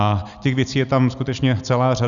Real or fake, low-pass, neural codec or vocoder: real; 7.2 kHz; none